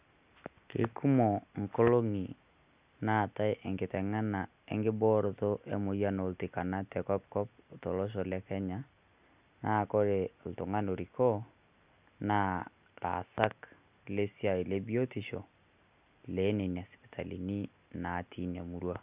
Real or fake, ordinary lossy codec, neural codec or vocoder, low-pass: real; Opus, 64 kbps; none; 3.6 kHz